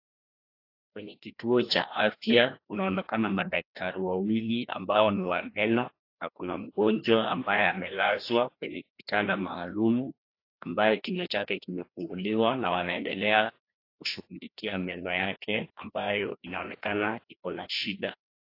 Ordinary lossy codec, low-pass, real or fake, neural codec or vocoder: AAC, 32 kbps; 5.4 kHz; fake; codec, 16 kHz, 1 kbps, FreqCodec, larger model